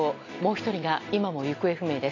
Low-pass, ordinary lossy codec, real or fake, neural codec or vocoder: 7.2 kHz; AAC, 32 kbps; real; none